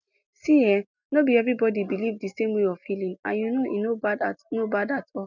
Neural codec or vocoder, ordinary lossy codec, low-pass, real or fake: none; none; 7.2 kHz; real